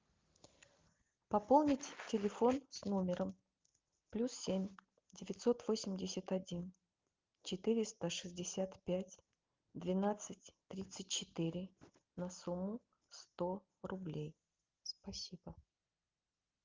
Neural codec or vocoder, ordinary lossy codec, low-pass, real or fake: none; Opus, 24 kbps; 7.2 kHz; real